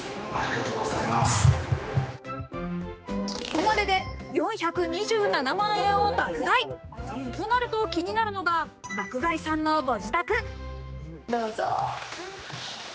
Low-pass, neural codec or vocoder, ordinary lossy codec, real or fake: none; codec, 16 kHz, 2 kbps, X-Codec, HuBERT features, trained on general audio; none; fake